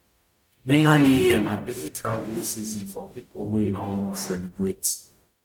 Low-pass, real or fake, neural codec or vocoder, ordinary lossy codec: 19.8 kHz; fake; codec, 44.1 kHz, 0.9 kbps, DAC; none